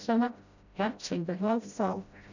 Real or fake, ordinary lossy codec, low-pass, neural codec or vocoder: fake; none; 7.2 kHz; codec, 16 kHz, 0.5 kbps, FreqCodec, smaller model